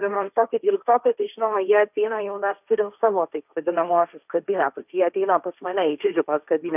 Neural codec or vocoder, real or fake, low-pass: codec, 16 kHz, 1.1 kbps, Voila-Tokenizer; fake; 3.6 kHz